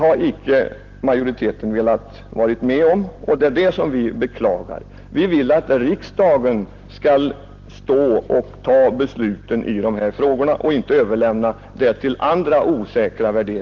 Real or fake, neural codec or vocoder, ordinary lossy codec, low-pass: real; none; Opus, 16 kbps; 7.2 kHz